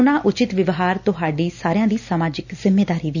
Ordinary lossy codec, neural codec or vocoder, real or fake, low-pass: none; none; real; 7.2 kHz